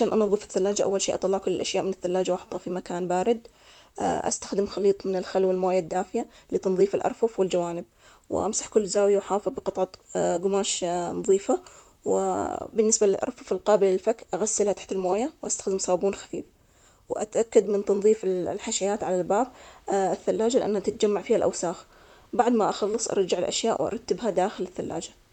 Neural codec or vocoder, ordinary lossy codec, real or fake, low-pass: vocoder, 44.1 kHz, 128 mel bands, Pupu-Vocoder; none; fake; 19.8 kHz